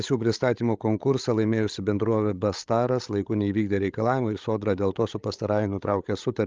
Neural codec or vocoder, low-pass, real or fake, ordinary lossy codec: codec, 16 kHz, 16 kbps, FreqCodec, larger model; 7.2 kHz; fake; Opus, 32 kbps